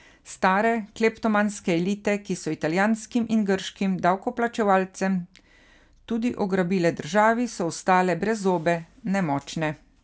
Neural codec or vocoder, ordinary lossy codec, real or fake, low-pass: none; none; real; none